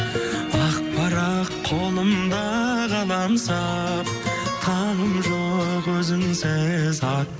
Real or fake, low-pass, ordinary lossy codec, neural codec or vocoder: real; none; none; none